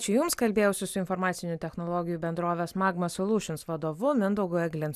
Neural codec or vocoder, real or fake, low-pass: none; real; 14.4 kHz